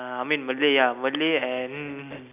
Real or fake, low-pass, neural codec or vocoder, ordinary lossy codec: real; 3.6 kHz; none; none